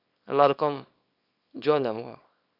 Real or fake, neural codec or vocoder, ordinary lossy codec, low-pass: fake; codec, 24 kHz, 0.9 kbps, WavTokenizer, small release; none; 5.4 kHz